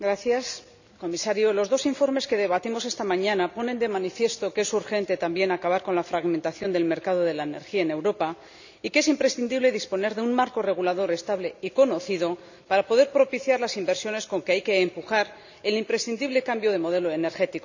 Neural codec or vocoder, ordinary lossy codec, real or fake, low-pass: none; none; real; 7.2 kHz